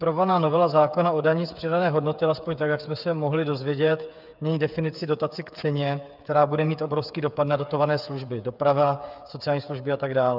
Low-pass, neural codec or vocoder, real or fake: 5.4 kHz; codec, 16 kHz, 8 kbps, FreqCodec, smaller model; fake